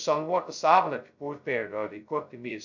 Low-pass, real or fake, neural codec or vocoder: 7.2 kHz; fake; codec, 16 kHz, 0.2 kbps, FocalCodec